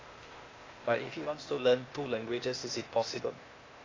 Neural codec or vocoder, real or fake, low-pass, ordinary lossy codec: codec, 16 kHz, 0.8 kbps, ZipCodec; fake; 7.2 kHz; AAC, 32 kbps